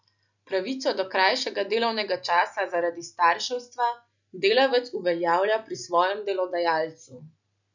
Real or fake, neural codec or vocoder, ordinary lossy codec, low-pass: real; none; none; 7.2 kHz